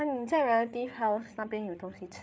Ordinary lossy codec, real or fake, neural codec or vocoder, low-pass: none; fake; codec, 16 kHz, 8 kbps, FreqCodec, larger model; none